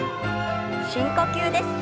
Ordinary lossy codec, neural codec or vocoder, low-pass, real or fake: none; none; none; real